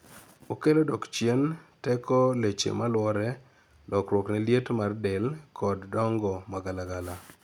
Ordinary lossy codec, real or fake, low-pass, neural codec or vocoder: none; real; none; none